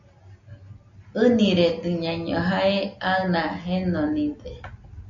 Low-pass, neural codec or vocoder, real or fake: 7.2 kHz; none; real